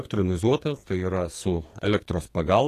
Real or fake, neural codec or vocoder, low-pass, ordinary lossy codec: fake; codec, 44.1 kHz, 2.6 kbps, SNAC; 14.4 kHz; AAC, 48 kbps